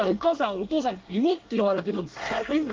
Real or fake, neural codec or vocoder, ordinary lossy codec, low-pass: fake; codec, 24 kHz, 1 kbps, SNAC; Opus, 16 kbps; 7.2 kHz